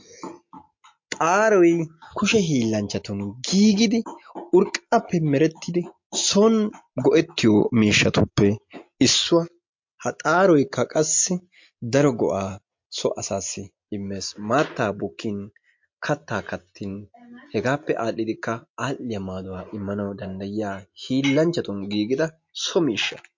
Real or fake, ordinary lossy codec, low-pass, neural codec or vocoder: real; MP3, 48 kbps; 7.2 kHz; none